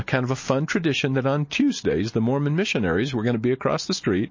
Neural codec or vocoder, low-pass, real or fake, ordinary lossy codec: none; 7.2 kHz; real; MP3, 32 kbps